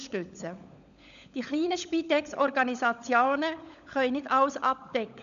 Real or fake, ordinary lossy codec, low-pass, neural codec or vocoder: fake; none; 7.2 kHz; codec, 16 kHz, 16 kbps, FunCodec, trained on LibriTTS, 50 frames a second